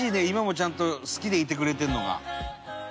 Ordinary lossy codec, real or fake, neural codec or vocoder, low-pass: none; real; none; none